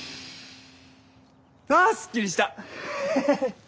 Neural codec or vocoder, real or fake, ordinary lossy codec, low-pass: none; real; none; none